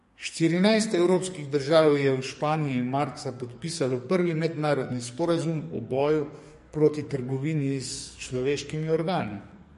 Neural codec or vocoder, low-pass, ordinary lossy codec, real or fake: codec, 44.1 kHz, 2.6 kbps, SNAC; 14.4 kHz; MP3, 48 kbps; fake